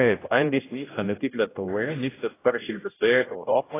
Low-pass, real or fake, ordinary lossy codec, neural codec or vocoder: 3.6 kHz; fake; AAC, 16 kbps; codec, 16 kHz, 0.5 kbps, X-Codec, HuBERT features, trained on general audio